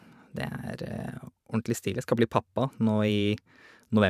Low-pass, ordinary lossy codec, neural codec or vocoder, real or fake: 14.4 kHz; none; vocoder, 44.1 kHz, 128 mel bands every 512 samples, BigVGAN v2; fake